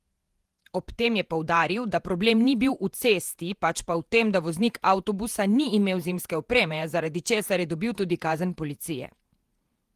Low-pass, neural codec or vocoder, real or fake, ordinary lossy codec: 14.4 kHz; vocoder, 48 kHz, 128 mel bands, Vocos; fake; Opus, 24 kbps